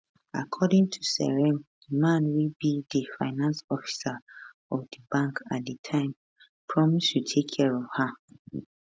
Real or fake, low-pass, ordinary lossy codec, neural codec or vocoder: real; none; none; none